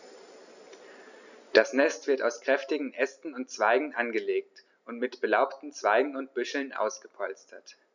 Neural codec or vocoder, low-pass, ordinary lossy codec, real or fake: none; 7.2 kHz; none; real